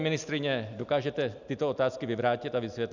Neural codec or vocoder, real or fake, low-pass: none; real; 7.2 kHz